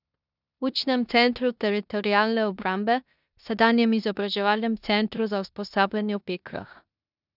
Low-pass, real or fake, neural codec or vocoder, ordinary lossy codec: 5.4 kHz; fake; codec, 16 kHz in and 24 kHz out, 0.9 kbps, LongCat-Audio-Codec, four codebook decoder; none